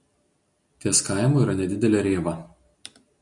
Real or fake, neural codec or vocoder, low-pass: real; none; 10.8 kHz